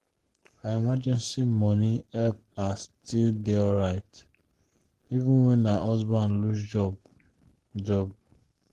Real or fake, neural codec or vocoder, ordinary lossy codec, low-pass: fake; codec, 44.1 kHz, 7.8 kbps, Pupu-Codec; Opus, 16 kbps; 14.4 kHz